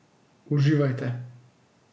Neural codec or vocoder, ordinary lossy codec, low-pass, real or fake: none; none; none; real